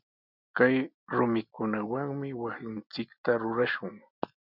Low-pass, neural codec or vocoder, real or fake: 5.4 kHz; none; real